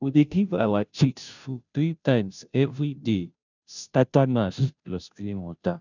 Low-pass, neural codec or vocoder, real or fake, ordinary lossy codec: 7.2 kHz; codec, 16 kHz, 0.5 kbps, FunCodec, trained on Chinese and English, 25 frames a second; fake; none